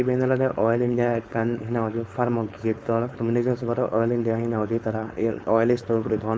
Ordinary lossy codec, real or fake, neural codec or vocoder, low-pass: none; fake; codec, 16 kHz, 4.8 kbps, FACodec; none